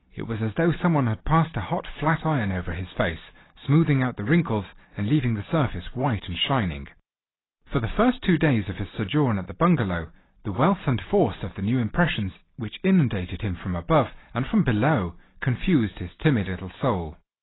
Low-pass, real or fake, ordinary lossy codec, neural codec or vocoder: 7.2 kHz; real; AAC, 16 kbps; none